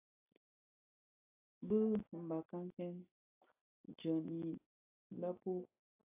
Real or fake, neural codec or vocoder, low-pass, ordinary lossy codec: real; none; 3.6 kHz; AAC, 32 kbps